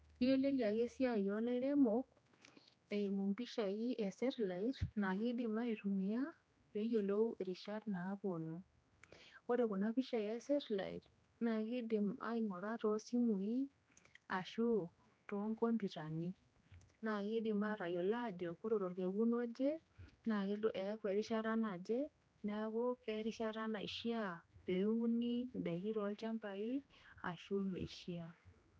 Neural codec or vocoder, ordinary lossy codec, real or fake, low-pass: codec, 16 kHz, 2 kbps, X-Codec, HuBERT features, trained on general audio; none; fake; none